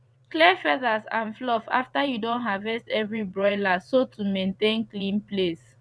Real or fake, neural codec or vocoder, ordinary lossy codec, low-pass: fake; vocoder, 22.05 kHz, 80 mel bands, WaveNeXt; none; none